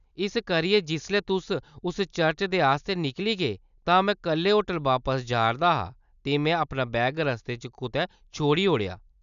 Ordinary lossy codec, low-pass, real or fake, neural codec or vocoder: none; 7.2 kHz; real; none